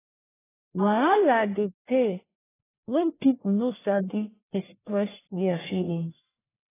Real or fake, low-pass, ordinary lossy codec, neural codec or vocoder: fake; 3.6 kHz; AAC, 16 kbps; codec, 44.1 kHz, 1.7 kbps, Pupu-Codec